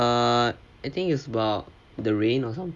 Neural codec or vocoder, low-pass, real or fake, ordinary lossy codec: none; none; real; none